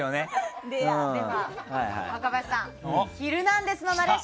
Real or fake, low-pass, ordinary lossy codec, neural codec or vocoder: real; none; none; none